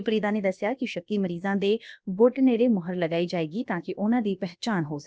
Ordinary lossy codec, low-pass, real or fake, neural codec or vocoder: none; none; fake; codec, 16 kHz, about 1 kbps, DyCAST, with the encoder's durations